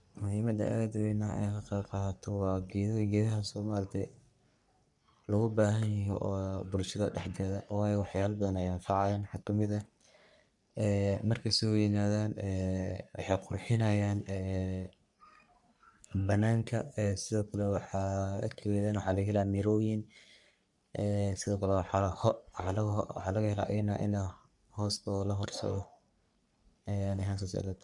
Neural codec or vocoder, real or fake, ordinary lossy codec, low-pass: codec, 44.1 kHz, 3.4 kbps, Pupu-Codec; fake; none; 10.8 kHz